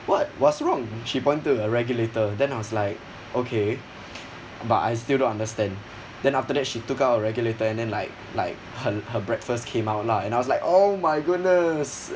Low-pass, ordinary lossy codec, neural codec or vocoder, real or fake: none; none; none; real